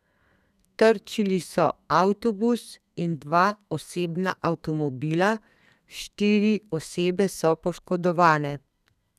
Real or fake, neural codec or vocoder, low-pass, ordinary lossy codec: fake; codec, 32 kHz, 1.9 kbps, SNAC; 14.4 kHz; none